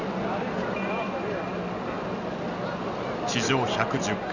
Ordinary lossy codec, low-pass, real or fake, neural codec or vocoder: none; 7.2 kHz; real; none